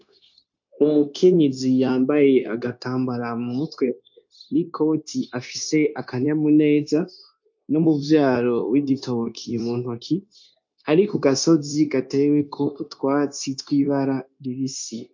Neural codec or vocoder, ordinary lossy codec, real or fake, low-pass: codec, 16 kHz, 0.9 kbps, LongCat-Audio-Codec; MP3, 48 kbps; fake; 7.2 kHz